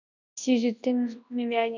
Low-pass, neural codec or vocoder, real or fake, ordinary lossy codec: 7.2 kHz; codec, 24 kHz, 0.9 kbps, WavTokenizer, large speech release; fake; none